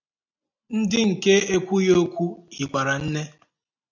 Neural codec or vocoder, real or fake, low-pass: none; real; 7.2 kHz